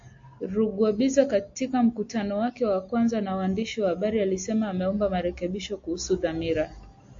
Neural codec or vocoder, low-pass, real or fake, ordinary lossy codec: none; 7.2 kHz; real; AAC, 48 kbps